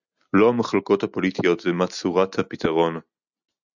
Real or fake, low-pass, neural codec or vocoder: real; 7.2 kHz; none